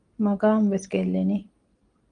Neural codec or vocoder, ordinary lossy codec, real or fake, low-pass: none; Opus, 24 kbps; real; 9.9 kHz